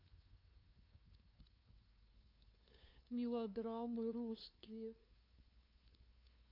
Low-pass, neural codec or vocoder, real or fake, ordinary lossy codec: 5.4 kHz; codec, 16 kHz, 2 kbps, FunCodec, trained on LibriTTS, 25 frames a second; fake; none